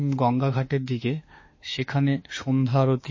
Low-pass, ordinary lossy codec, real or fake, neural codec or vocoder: 7.2 kHz; MP3, 32 kbps; fake; autoencoder, 48 kHz, 32 numbers a frame, DAC-VAE, trained on Japanese speech